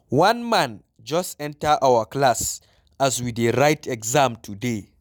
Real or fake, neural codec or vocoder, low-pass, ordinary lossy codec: real; none; 19.8 kHz; none